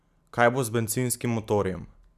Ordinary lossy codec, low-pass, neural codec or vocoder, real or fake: none; 14.4 kHz; none; real